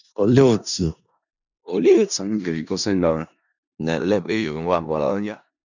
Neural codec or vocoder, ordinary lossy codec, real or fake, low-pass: codec, 16 kHz in and 24 kHz out, 0.4 kbps, LongCat-Audio-Codec, four codebook decoder; none; fake; 7.2 kHz